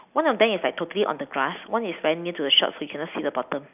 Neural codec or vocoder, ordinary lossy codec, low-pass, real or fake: none; none; 3.6 kHz; real